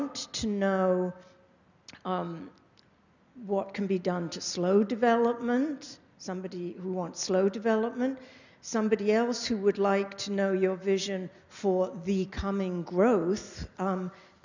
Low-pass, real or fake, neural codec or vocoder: 7.2 kHz; real; none